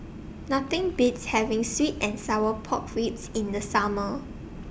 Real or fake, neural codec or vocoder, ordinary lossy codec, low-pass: real; none; none; none